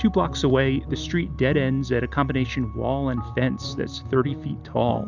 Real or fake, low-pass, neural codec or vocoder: real; 7.2 kHz; none